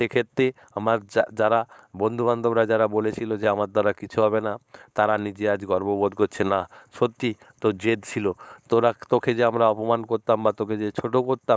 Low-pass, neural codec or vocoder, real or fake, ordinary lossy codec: none; codec, 16 kHz, 4.8 kbps, FACodec; fake; none